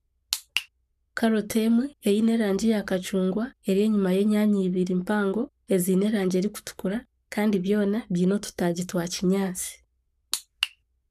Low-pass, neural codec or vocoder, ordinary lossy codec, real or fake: 14.4 kHz; codec, 44.1 kHz, 7.8 kbps, Pupu-Codec; none; fake